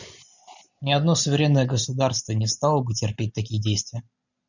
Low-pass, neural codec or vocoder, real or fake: 7.2 kHz; none; real